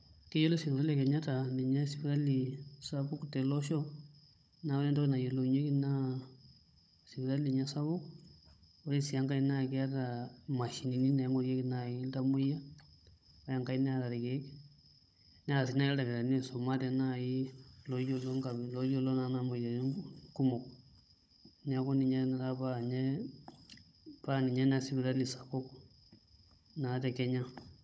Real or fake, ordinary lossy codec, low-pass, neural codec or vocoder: fake; none; none; codec, 16 kHz, 16 kbps, FunCodec, trained on Chinese and English, 50 frames a second